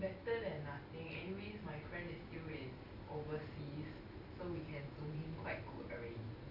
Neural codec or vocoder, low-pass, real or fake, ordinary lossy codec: none; 5.4 kHz; real; none